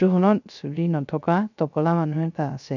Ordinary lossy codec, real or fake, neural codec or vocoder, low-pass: none; fake; codec, 16 kHz, 0.3 kbps, FocalCodec; 7.2 kHz